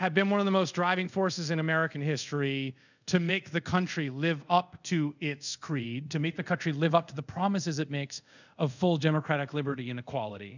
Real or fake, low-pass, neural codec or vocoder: fake; 7.2 kHz; codec, 24 kHz, 0.5 kbps, DualCodec